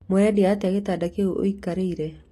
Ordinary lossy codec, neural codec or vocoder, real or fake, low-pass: AAC, 48 kbps; none; real; 14.4 kHz